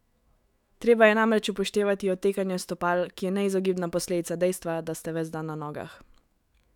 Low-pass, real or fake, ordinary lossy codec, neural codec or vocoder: 19.8 kHz; fake; none; autoencoder, 48 kHz, 128 numbers a frame, DAC-VAE, trained on Japanese speech